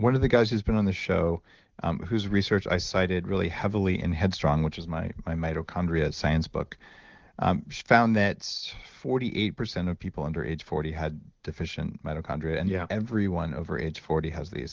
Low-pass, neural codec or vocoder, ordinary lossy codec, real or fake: 7.2 kHz; none; Opus, 16 kbps; real